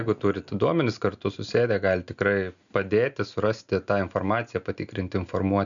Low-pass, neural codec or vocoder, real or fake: 7.2 kHz; none; real